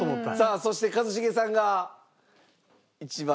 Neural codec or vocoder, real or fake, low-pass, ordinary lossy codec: none; real; none; none